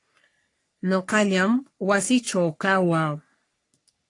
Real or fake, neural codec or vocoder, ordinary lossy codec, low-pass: fake; codec, 44.1 kHz, 3.4 kbps, Pupu-Codec; AAC, 48 kbps; 10.8 kHz